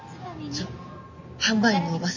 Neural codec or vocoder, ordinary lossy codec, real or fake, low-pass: none; none; real; 7.2 kHz